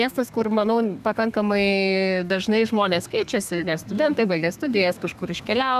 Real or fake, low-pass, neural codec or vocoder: fake; 14.4 kHz; codec, 32 kHz, 1.9 kbps, SNAC